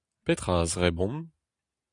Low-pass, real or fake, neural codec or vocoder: 10.8 kHz; real; none